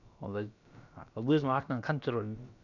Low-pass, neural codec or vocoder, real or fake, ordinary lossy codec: 7.2 kHz; codec, 16 kHz, about 1 kbps, DyCAST, with the encoder's durations; fake; none